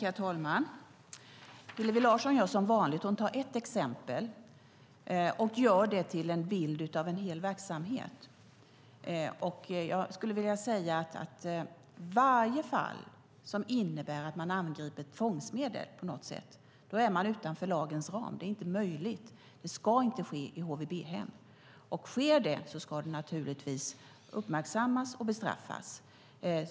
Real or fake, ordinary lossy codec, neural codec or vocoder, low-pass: real; none; none; none